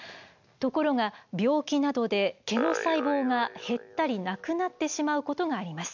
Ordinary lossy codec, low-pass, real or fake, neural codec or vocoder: MP3, 64 kbps; 7.2 kHz; real; none